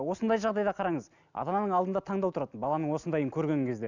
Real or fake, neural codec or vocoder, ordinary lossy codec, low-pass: real; none; none; 7.2 kHz